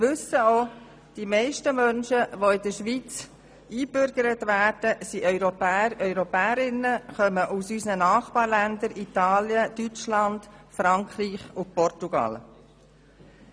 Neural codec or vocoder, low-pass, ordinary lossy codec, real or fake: none; none; none; real